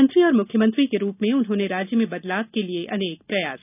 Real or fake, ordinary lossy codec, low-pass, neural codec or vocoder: real; none; 3.6 kHz; none